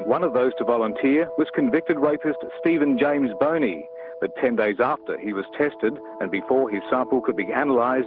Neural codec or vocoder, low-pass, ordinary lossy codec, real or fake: none; 5.4 kHz; Opus, 16 kbps; real